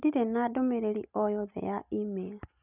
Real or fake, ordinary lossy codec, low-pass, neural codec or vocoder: real; none; 3.6 kHz; none